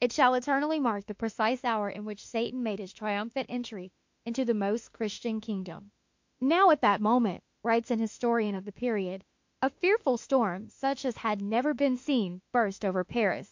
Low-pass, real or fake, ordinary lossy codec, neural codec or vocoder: 7.2 kHz; fake; MP3, 48 kbps; autoencoder, 48 kHz, 32 numbers a frame, DAC-VAE, trained on Japanese speech